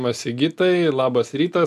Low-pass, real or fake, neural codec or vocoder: 14.4 kHz; real; none